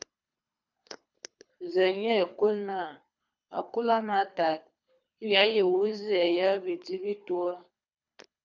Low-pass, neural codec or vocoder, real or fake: 7.2 kHz; codec, 24 kHz, 3 kbps, HILCodec; fake